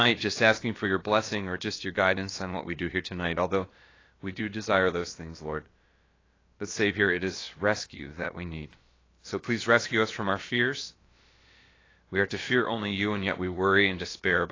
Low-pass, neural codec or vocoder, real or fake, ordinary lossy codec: 7.2 kHz; codec, 16 kHz, about 1 kbps, DyCAST, with the encoder's durations; fake; AAC, 32 kbps